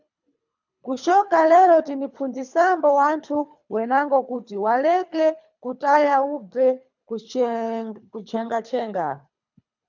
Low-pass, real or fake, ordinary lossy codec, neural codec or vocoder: 7.2 kHz; fake; MP3, 64 kbps; codec, 24 kHz, 3 kbps, HILCodec